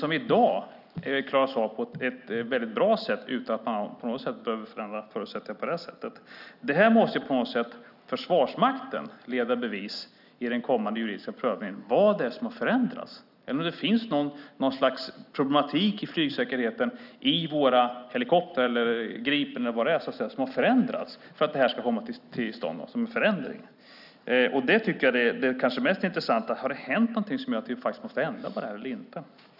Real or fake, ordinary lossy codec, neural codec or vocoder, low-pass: real; none; none; 5.4 kHz